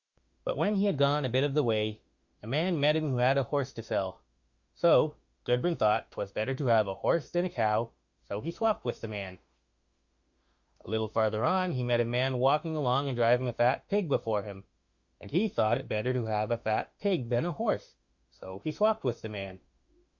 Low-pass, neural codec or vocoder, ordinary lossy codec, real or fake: 7.2 kHz; autoencoder, 48 kHz, 32 numbers a frame, DAC-VAE, trained on Japanese speech; Opus, 64 kbps; fake